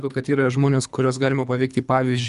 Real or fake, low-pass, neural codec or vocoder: fake; 10.8 kHz; codec, 24 kHz, 3 kbps, HILCodec